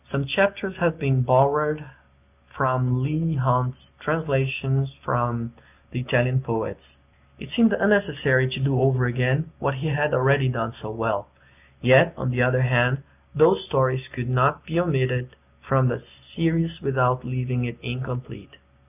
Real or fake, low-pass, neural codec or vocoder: real; 3.6 kHz; none